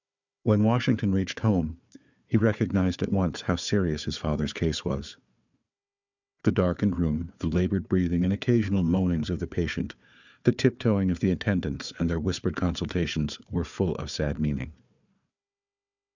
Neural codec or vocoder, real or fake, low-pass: codec, 16 kHz, 4 kbps, FunCodec, trained on Chinese and English, 50 frames a second; fake; 7.2 kHz